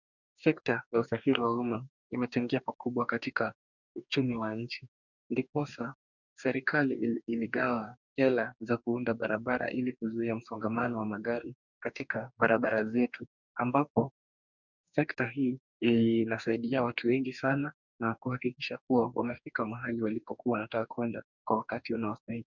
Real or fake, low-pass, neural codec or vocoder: fake; 7.2 kHz; codec, 44.1 kHz, 2.6 kbps, DAC